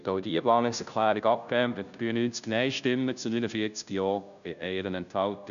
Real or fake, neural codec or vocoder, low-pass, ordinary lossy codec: fake; codec, 16 kHz, 0.5 kbps, FunCodec, trained on Chinese and English, 25 frames a second; 7.2 kHz; none